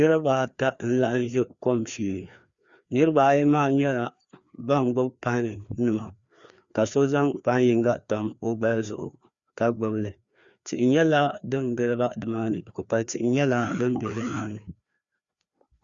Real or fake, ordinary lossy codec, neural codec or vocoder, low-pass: fake; Opus, 64 kbps; codec, 16 kHz, 2 kbps, FreqCodec, larger model; 7.2 kHz